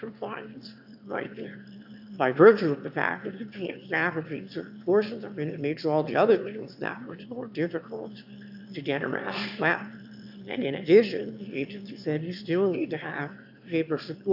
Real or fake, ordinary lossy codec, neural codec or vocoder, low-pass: fake; AAC, 48 kbps; autoencoder, 22.05 kHz, a latent of 192 numbers a frame, VITS, trained on one speaker; 5.4 kHz